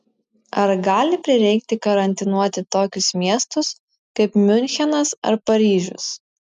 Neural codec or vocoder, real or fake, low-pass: none; real; 10.8 kHz